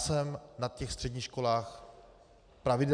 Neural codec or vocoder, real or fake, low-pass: none; real; 9.9 kHz